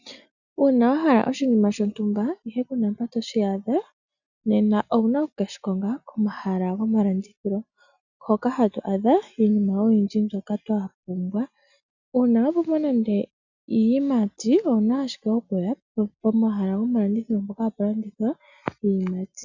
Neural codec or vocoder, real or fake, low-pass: none; real; 7.2 kHz